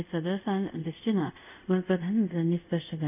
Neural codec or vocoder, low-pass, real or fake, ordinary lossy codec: codec, 24 kHz, 0.5 kbps, DualCodec; 3.6 kHz; fake; none